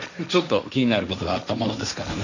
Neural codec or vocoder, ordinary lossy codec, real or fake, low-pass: codec, 16 kHz, 1.1 kbps, Voila-Tokenizer; none; fake; 7.2 kHz